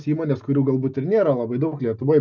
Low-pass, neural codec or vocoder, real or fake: 7.2 kHz; none; real